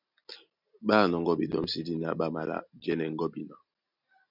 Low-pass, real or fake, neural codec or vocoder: 5.4 kHz; real; none